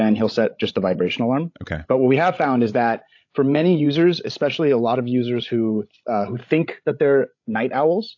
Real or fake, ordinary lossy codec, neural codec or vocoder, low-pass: fake; AAC, 48 kbps; codec, 16 kHz, 16 kbps, FreqCodec, larger model; 7.2 kHz